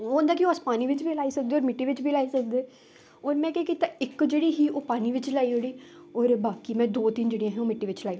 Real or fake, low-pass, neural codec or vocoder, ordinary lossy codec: real; none; none; none